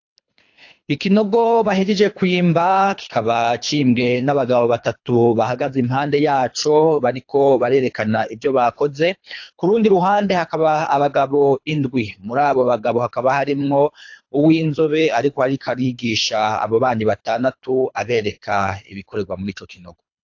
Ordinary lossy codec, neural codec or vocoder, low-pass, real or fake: AAC, 48 kbps; codec, 24 kHz, 3 kbps, HILCodec; 7.2 kHz; fake